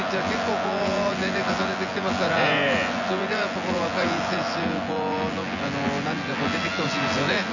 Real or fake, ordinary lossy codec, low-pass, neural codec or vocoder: fake; none; 7.2 kHz; vocoder, 24 kHz, 100 mel bands, Vocos